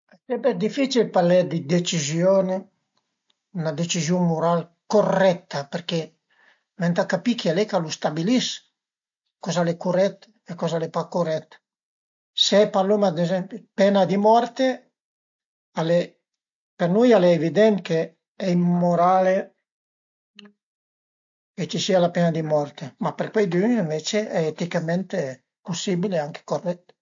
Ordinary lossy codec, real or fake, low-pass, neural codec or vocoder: MP3, 48 kbps; real; 7.2 kHz; none